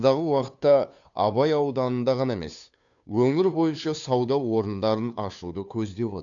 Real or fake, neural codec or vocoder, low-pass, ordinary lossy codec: fake; codec, 16 kHz, 2 kbps, FunCodec, trained on Chinese and English, 25 frames a second; 7.2 kHz; none